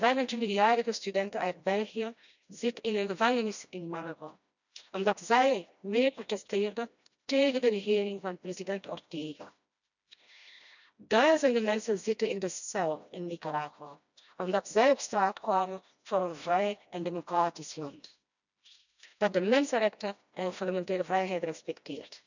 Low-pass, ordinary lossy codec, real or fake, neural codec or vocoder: 7.2 kHz; none; fake; codec, 16 kHz, 1 kbps, FreqCodec, smaller model